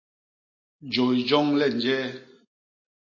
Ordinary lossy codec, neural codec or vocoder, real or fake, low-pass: MP3, 32 kbps; none; real; 7.2 kHz